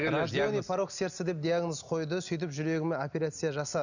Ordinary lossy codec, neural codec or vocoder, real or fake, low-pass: none; none; real; 7.2 kHz